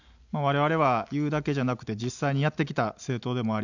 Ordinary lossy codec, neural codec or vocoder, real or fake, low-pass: none; none; real; 7.2 kHz